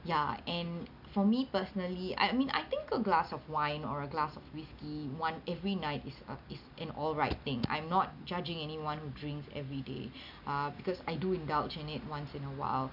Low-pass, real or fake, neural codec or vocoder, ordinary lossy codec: 5.4 kHz; real; none; none